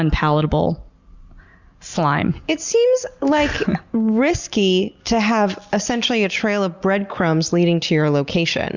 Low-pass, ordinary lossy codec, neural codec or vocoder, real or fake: 7.2 kHz; Opus, 64 kbps; none; real